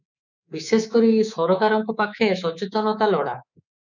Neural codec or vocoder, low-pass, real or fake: codec, 24 kHz, 3.1 kbps, DualCodec; 7.2 kHz; fake